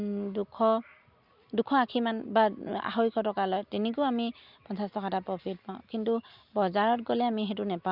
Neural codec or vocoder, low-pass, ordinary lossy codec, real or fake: none; 5.4 kHz; Opus, 64 kbps; real